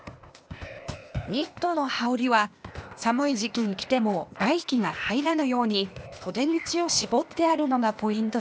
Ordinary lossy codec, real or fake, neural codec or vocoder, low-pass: none; fake; codec, 16 kHz, 0.8 kbps, ZipCodec; none